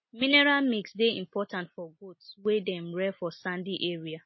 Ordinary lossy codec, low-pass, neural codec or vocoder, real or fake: MP3, 24 kbps; 7.2 kHz; none; real